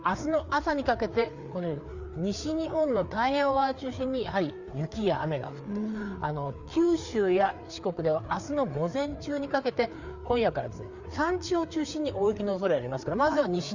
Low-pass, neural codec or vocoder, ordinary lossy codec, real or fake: 7.2 kHz; codec, 16 kHz, 4 kbps, FreqCodec, larger model; Opus, 64 kbps; fake